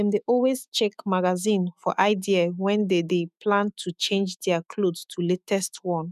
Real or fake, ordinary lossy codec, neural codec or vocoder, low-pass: fake; none; autoencoder, 48 kHz, 128 numbers a frame, DAC-VAE, trained on Japanese speech; 14.4 kHz